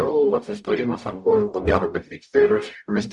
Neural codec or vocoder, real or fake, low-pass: codec, 44.1 kHz, 0.9 kbps, DAC; fake; 10.8 kHz